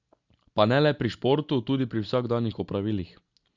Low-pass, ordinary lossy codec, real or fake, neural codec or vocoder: 7.2 kHz; Opus, 64 kbps; real; none